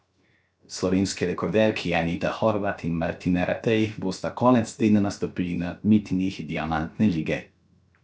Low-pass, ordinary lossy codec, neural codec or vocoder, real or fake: none; none; codec, 16 kHz, 0.7 kbps, FocalCodec; fake